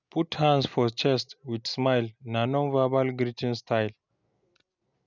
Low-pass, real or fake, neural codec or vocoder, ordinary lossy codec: 7.2 kHz; real; none; none